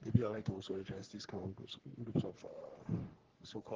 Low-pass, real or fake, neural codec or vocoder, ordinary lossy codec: 7.2 kHz; fake; codec, 44.1 kHz, 3.4 kbps, Pupu-Codec; Opus, 16 kbps